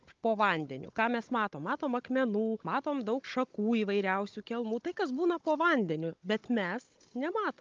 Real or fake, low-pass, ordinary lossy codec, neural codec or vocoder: fake; 7.2 kHz; Opus, 24 kbps; codec, 16 kHz, 16 kbps, FunCodec, trained on Chinese and English, 50 frames a second